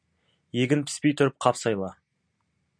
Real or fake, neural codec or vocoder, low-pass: real; none; 9.9 kHz